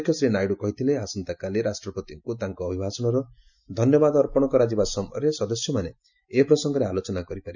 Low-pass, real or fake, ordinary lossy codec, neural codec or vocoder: 7.2 kHz; real; none; none